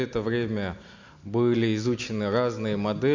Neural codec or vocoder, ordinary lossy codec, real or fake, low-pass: none; MP3, 64 kbps; real; 7.2 kHz